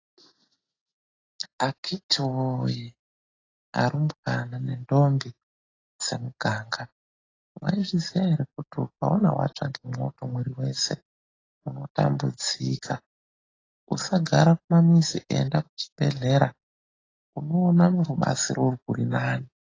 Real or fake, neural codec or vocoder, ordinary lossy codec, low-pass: real; none; AAC, 32 kbps; 7.2 kHz